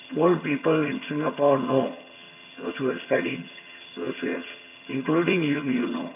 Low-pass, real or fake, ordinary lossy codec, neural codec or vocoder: 3.6 kHz; fake; none; vocoder, 22.05 kHz, 80 mel bands, HiFi-GAN